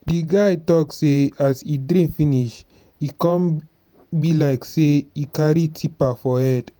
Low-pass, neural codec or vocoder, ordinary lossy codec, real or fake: none; vocoder, 48 kHz, 128 mel bands, Vocos; none; fake